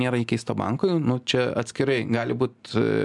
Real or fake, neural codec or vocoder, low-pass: real; none; 10.8 kHz